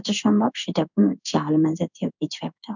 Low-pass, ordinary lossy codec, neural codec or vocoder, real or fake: 7.2 kHz; none; codec, 16 kHz in and 24 kHz out, 1 kbps, XY-Tokenizer; fake